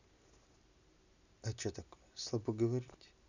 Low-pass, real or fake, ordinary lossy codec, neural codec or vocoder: 7.2 kHz; real; none; none